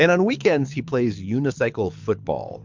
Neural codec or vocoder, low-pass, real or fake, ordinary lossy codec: codec, 24 kHz, 6 kbps, HILCodec; 7.2 kHz; fake; MP3, 64 kbps